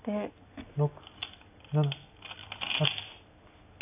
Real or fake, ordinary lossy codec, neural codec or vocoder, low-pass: real; AAC, 24 kbps; none; 3.6 kHz